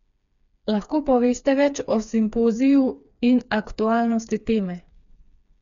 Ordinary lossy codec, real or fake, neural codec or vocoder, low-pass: none; fake; codec, 16 kHz, 4 kbps, FreqCodec, smaller model; 7.2 kHz